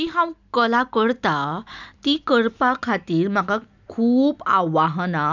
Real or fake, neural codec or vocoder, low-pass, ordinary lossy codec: real; none; 7.2 kHz; none